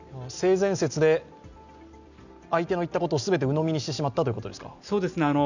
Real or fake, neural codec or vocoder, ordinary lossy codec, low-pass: real; none; none; 7.2 kHz